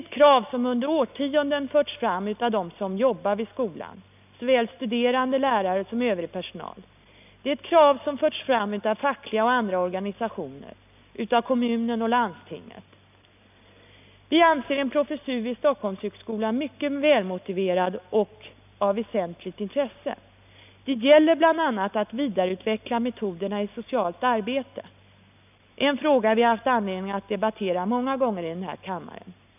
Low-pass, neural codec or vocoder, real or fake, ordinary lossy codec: 3.6 kHz; none; real; none